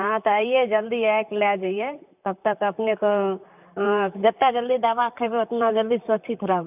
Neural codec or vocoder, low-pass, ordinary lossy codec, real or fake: vocoder, 44.1 kHz, 128 mel bands, Pupu-Vocoder; 3.6 kHz; none; fake